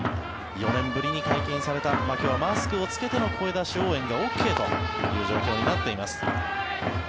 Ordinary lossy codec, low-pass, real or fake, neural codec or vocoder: none; none; real; none